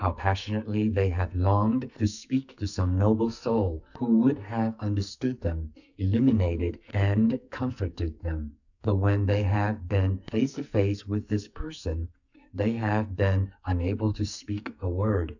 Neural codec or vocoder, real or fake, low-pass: codec, 44.1 kHz, 2.6 kbps, SNAC; fake; 7.2 kHz